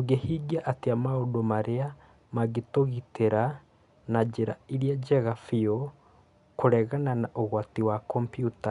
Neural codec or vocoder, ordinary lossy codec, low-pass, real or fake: none; none; 10.8 kHz; real